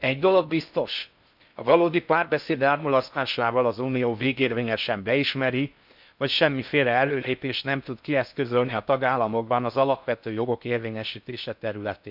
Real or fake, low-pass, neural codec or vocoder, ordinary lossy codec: fake; 5.4 kHz; codec, 16 kHz in and 24 kHz out, 0.6 kbps, FocalCodec, streaming, 4096 codes; none